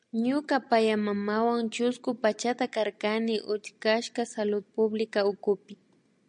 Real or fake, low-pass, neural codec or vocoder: real; 9.9 kHz; none